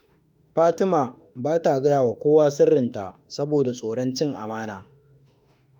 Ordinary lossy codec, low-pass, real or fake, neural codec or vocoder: none; 19.8 kHz; fake; autoencoder, 48 kHz, 32 numbers a frame, DAC-VAE, trained on Japanese speech